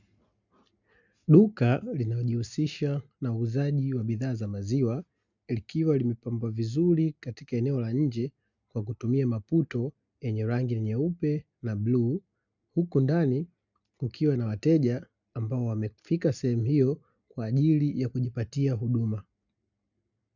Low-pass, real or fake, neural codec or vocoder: 7.2 kHz; real; none